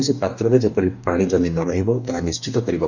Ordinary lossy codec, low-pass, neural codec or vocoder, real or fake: none; 7.2 kHz; codec, 44.1 kHz, 2.6 kbps, DAC; fake